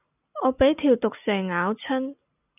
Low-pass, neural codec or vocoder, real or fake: 3.6 kHz; none; real